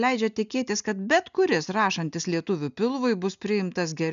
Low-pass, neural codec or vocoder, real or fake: 7.2 kHz; none; real